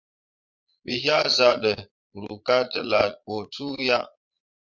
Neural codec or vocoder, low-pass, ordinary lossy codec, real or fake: vocoder, 22.05 kHz, 80 mel bands, Vocos; 7.2 kHz; MP3, 64 kbps; fake